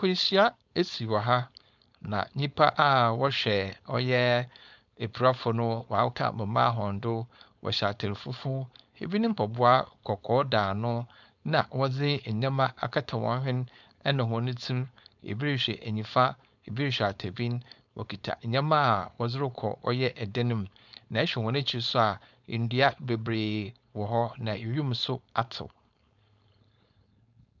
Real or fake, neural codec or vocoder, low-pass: fake; codec, 16 kHz, 4.8 kbps, FACodec; 7.2 kHz